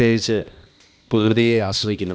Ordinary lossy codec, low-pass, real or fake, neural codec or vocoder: none; none; fake; codec, 16 kHz, 1 kbps, X-Codec, HuBERT features, trained on balanced general audio